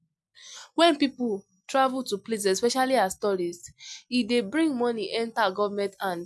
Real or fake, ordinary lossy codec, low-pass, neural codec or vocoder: real; none; none; none